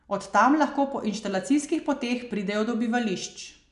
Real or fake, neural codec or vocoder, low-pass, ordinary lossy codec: real; none; 10.8 kHz; none